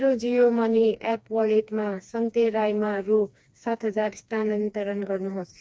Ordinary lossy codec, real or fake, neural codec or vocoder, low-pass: none; fake; codec, 16 kHz, 2 kbps, FreqCodec, smaller model; none